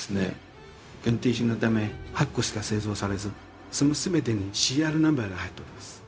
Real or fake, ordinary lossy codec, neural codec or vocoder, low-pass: fake; none; codec, 16 kHz, 0.4 kbps, LongCat-Audio-Codec; none